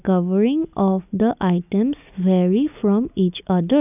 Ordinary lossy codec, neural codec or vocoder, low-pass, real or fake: none; none; 3.6 kHz; real